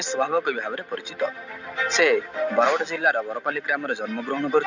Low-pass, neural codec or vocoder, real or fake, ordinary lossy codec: 7.2 kHz; none; real; none